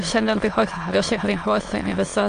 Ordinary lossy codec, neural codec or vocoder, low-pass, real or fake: AAC, 48 kbps; autoencoder, 22.05 kHz, a latent of 192 numbers a frame, VITS, trained on many speakers; 9.9 kHz; fake